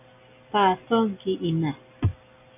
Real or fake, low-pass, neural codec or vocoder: real; 3.6 kHz; none